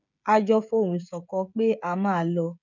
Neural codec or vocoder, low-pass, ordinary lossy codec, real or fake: codec, 16 kHz, 16 kbps, FreqCodec, smaller model; 7.2 kHz; none; fake